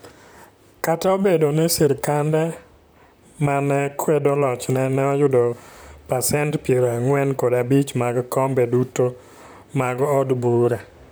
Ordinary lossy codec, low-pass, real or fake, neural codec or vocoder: none; none; fake; vocoder, 44.1 kHz, 128 mel bands, Pupu-Vocoder